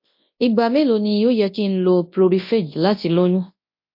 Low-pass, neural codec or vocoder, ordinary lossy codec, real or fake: 5.4 kHz; codec, 24 kHz, 0.9 kbps, WavTokenizer, large speech release; MP3, 32 kbps; fake